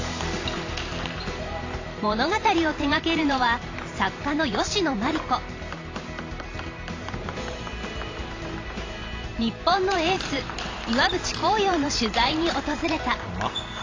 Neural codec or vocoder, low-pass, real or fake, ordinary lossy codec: vocoder, 44.1 kHz, 128 mel bands every 512 samples, BigVGAN v2; 7.2 kHz; fake; AAC, 32 kbps